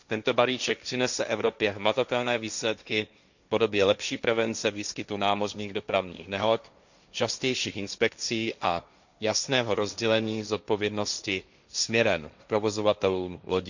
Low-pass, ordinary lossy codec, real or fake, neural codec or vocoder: 7.2 kHz; none; fake; codec, 16 kHz, 1.1 kbps, Voila-Tokenizer